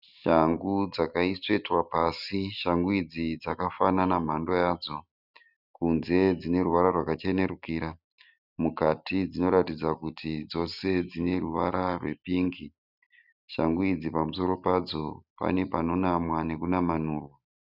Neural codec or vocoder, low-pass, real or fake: none; 5.4 kHz; real